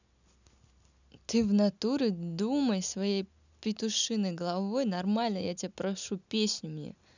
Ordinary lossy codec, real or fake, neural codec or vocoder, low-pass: none; real; none; 7.2 kHz